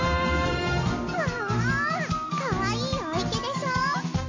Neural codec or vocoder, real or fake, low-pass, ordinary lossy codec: none; real; 7.2 kHz; MP3, 32 kbps